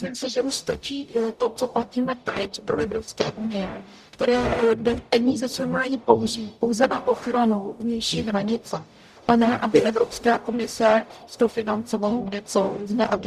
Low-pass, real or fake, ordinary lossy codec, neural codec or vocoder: 14.4 kHz; fake; Opus, 64 kbps; codec, 44.1 kHz, 0.9 kbps, DAC